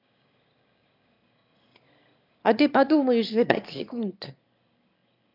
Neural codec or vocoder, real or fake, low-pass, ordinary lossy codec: autoencoder, 22.05 kHz, a latent of 192 numbers a frame, VITS, trained on one speaker; fake; 5.4 kHz; MP3, 48 kbps